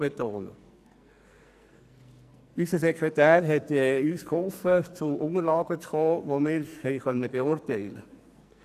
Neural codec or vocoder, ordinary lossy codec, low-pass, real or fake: codec, 44.1 kHz, 2.6 kbps, SNAC; none; 14.4 kHz; fake